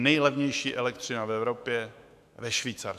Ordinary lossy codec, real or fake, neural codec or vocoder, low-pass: AAC, 96 kbps; fake; autoencoder, 48 kHz, 128 numbers a frame, DAC-VAE, trained on Japanese speech; 14.4 kHz